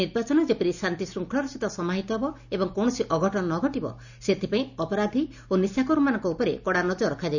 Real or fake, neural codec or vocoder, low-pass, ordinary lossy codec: real; none; 7.2 kHz; none